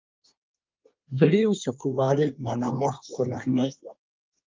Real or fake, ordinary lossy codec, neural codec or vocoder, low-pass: fake; Opus, 24 kbps; codec, 24 kHz, 1 kbps, SNAC; 7.2 kHz